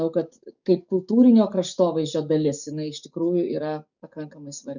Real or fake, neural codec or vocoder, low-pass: fake; codec, 44.1 kHz, 7.8 kbps, DAC; 7.2 kHz